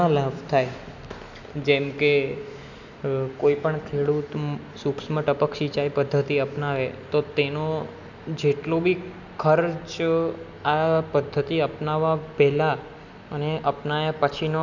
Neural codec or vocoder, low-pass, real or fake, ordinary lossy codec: none; 7.2 kHz; real; none